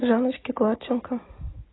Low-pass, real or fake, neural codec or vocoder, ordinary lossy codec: 7.2 kHz; real; none; AAC, 16 kbps